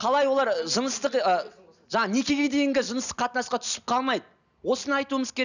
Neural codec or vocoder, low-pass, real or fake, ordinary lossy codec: none; 7.2 kHz; real; none